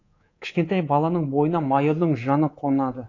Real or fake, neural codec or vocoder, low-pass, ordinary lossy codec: fake; codec, 24 kHz, 3.1 kbps, DualCodec; 7.2 kHz; AAC, 32 kbps